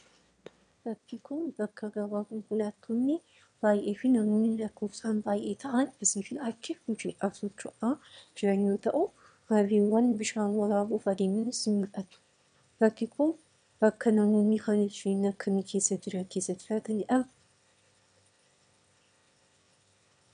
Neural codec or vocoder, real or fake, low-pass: autoencoder, 22.05 kHz, a latent of 192 numbers a frame, VITS, trained on one speaker; fake; 9.9 kHz